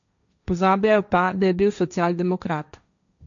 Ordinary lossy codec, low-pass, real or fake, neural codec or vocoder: none; 7.2 kHz; fake; codec, 16 kHz, 1.1 kbps, Voila-Tokenizer